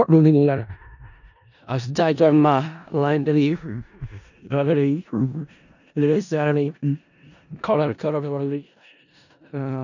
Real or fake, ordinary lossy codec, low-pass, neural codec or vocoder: fake; none; 7.2 kHz; codec, 16 kHz in and 24 kHz out, 0.4 kbps, LongCat-Audio-Codec, four codebook decoder